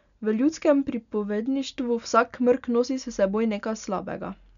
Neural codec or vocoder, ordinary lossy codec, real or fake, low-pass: none; none; real; 7.2 kHz